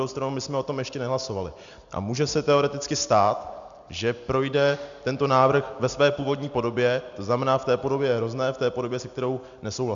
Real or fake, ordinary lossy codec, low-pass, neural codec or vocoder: real; MP3, 96 kbps; 7.2 kHz; none